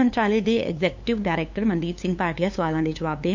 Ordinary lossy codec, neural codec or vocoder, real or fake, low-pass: MP3, 64 kbps; codec, 16 kHz, 2 kbps, FunCodec, trained on LibriTTS, 25 frames a second; fake; 7.2 kHz